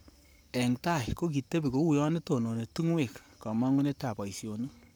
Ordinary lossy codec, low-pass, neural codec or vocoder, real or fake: none; none; codec, 44.1 kHz, 7.8 kbps, Pupu-Codec; fake